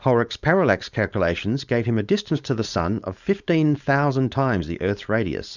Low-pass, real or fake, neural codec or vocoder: 7.2 kHz; fake; codec, 16 kHz, 4.8 kbps, FACodec